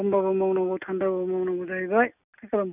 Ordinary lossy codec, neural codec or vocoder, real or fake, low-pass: none; none; real; 3.6 kHz